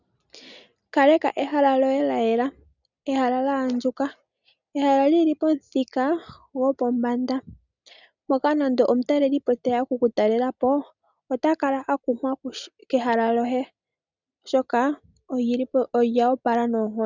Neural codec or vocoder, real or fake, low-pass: none; real; 7.2 kHz